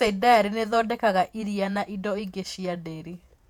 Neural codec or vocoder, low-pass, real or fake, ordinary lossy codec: vocoder, 48 kHz, 128 mel bands, Vocos; 19.8 kHz; fake; MP3, 96 kbps